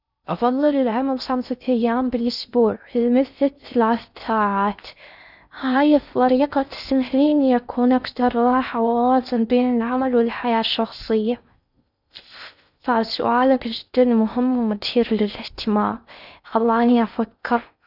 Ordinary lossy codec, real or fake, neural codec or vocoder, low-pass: none; fake; codec, 16 kHz in and 24 kHz out, 0.6 kbps, FocalCodec, streaming, 2048 codes; 5.4 kHz